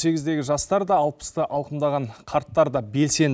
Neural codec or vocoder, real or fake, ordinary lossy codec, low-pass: none; real; none; none